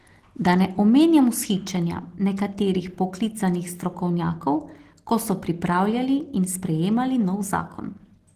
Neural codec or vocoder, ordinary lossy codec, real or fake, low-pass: none; Opus, 16 kbps; real; 14.4 kHz